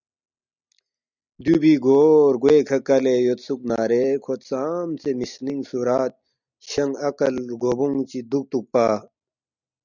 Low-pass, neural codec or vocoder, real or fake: 7.2 kHz; none; real